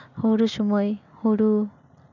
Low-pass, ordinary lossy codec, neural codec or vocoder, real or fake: 7.2 kHz; none; none; real